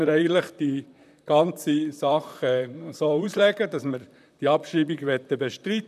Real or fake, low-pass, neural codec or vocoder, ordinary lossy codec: fake; 14.4 kHz; vocoder, 44.1 kHz, 128 mel bands, Pupu-Vocoder; none